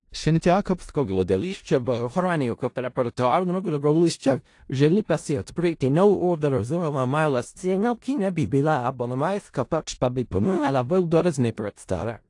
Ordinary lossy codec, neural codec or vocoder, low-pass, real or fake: AAC, 48 kbps; codec, 16 kHz in and 24 kHz out, 0.4 kbps, LongCat-Audio-Codec, four codebook decoder; 10.8 kHz; fake